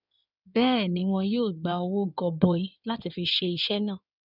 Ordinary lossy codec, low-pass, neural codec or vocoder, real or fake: none; 5.4 kHz; codec, 16 kHz in and 24 kHz out, 2.2 kbps, FireRedTTS-2 codec; fake